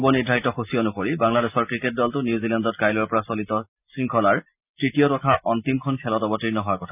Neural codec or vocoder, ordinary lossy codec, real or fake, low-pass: none; none; real; 3.6 kHz